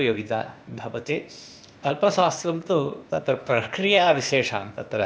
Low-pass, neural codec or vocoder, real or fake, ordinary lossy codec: none; codec, 16 kHz, 0.8 kbps, ZipCodec; fake; none